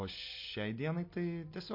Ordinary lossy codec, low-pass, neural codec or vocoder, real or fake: MP3, 32 kbps; 5.4 kHz; none; real